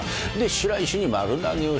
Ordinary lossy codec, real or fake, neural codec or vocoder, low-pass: none; real; none; none